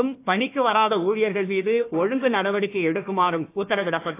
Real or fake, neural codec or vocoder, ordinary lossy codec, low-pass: fake; codec, 16 kHz, 1 kbps, FunCodec, trained on Chinese and English, 50 frames a second; AAC, 24 kbps; 3.6 kHz